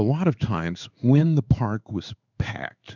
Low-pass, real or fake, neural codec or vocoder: 7.2 kHz; fake; codec, 16 kHz in and 24 kHz out, 1 kbps, XY-Tokenizer